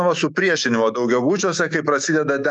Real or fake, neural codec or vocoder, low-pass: fake; autoencoder, 48 kHz, 128 numbers a frame, DAC-VAE, trained on Japanese speech; 10.8 kHz